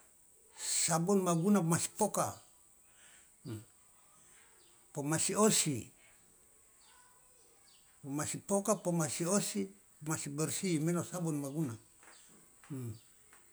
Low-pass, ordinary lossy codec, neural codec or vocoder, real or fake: none; none; none; real